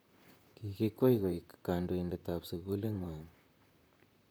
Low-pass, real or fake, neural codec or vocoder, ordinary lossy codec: none; fake; vocoder, 44.1 kHz, 128 mel bands, Pupu-Vocoder; none